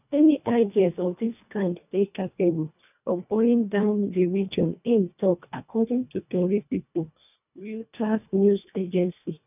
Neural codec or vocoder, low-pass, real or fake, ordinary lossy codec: codec, 24 kHz, 1.5 kbps, HILCodec; 3.6 kHz; fake; none